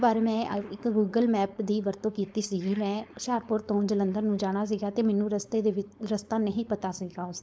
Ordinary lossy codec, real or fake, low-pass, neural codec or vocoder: none; fake; none; codec, 16 kHz, 4.8 kbps, FACodec